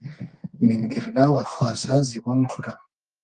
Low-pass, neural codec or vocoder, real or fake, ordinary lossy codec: 10.8 kHz; codec, 24 kHz, 0.9 kbps, WavTokenizer, medium music audio release; fake; Opus, 24 kbps